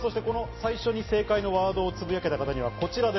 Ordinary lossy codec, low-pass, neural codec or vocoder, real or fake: MP3, 24 kbps; 7.2 kHz; none; real